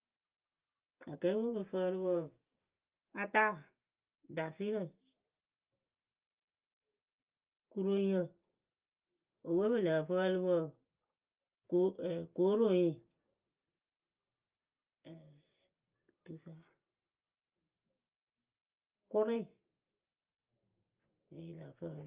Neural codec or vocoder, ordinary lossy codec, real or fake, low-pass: none; Opus, 24 kbps; real; 3.6 kHz